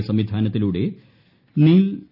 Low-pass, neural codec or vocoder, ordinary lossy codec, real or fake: 5.4 kHz; none; none; real